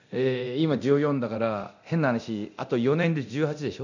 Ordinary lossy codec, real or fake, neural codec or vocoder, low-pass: none; fake; codec, 24 kHz, 0.9 kbps, DualCodec; 7.2 kHz